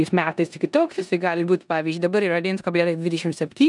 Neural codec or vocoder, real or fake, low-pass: codec, 16 kHz in and 24 kHz out, 0.9 kbps, LongCat-Audio-Codec, four codebook decoder; fake; 10.8 kHz